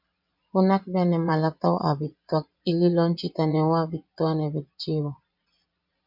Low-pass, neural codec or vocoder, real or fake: 5.4 kHz; vocoder, 22.05 kHz, 80 mel bands, Vocos; fake